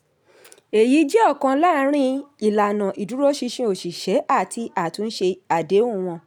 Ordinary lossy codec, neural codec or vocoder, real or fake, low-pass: none; none; real; 19.8 kHz